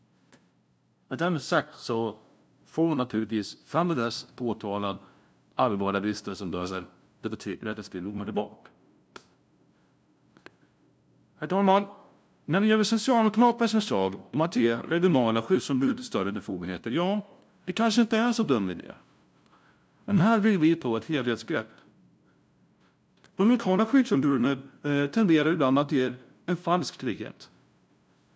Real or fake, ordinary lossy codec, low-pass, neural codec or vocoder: fake; none; none; codec, 16 kHz, 0.5 kbps, FunCodec, trained on LibriTTS, 25 frames a second